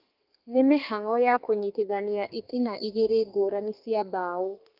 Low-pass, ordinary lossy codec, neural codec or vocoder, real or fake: 5.4 kHz; Opus, 32 kbps; codec, 32 kHz, 1.9 kbps, SNAC; fake